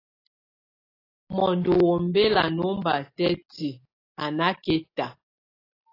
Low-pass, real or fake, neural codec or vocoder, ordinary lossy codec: 5.4 kHz; real; none; AAC, 24 kbps